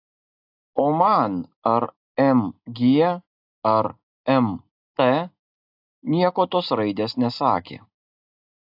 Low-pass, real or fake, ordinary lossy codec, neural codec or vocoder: 5.4 kHz; real; AAC, 48 kbps; none